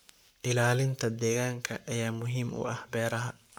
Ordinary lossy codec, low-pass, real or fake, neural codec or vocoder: none; none; fake; codec, 44.1 kHz, 7.8 kbps, Pupu-Codec